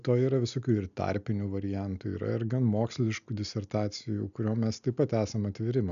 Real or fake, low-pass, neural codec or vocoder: real; 7.2 kHz; none